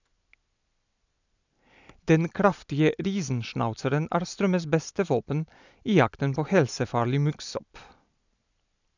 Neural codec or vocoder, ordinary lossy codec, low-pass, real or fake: none; none; 7.2 kHz; real